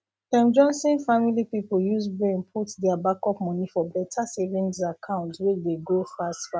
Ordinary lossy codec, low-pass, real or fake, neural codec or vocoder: none; none; real; none